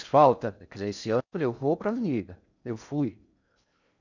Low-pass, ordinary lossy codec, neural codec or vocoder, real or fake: 7.2 kHz; none; codec, 16 kHz in and 24 kHz out, 0.6 kbps, FocalCodec, streaming, 4096 codes; fake